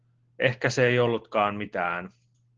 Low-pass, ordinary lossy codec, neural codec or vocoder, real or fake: 7.2 kHz; Opus, 16 kbps; none; real